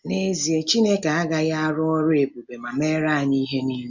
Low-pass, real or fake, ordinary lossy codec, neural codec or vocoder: 7.2 kHz; real; none; none